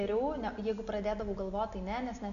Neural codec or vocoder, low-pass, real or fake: none; 7.2 kHz; real